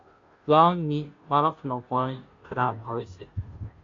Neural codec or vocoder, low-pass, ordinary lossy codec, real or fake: codec, 16 kHz, 0.5 kbps, FunCodec, trained on Chinese and English, 25 frames a second; 7.2 kHz; MP3, 48 kbps; fake